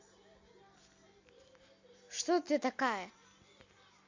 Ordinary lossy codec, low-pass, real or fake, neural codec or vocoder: MP3, 48 kbps; 7.2 kHz; real; none